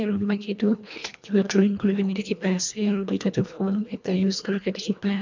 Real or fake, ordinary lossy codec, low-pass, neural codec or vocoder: fake; MP3, 64 kbps; 7.2 kHz; codec, 24 kHz, 1.5 kbps, HILCodec